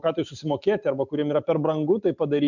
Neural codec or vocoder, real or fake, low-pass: none; real; 7.2 kHz